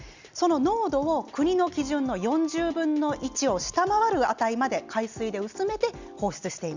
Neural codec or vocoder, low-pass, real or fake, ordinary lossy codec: none; 7.2 kHz; real; Opus, 64 kbps